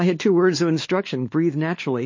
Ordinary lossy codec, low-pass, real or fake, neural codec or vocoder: MP3, 32 kbps; 7.2 kHz; fake; codec, 16 kHz, 2 kbps, X-Codec, WavLM features, trained on Multilingual LibriSpeech